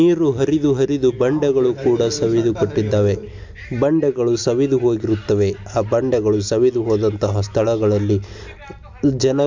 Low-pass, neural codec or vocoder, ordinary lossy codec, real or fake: 7.2 kHz; none; MP3, 64 kbps; real